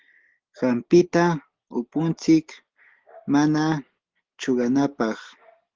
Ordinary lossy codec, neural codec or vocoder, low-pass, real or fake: Opus, 16 kbps; none; 7.2 kHz; real